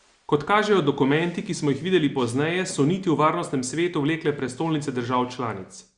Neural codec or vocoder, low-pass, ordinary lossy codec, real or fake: none; 9.9 kHz; Opus, 64 kbps; real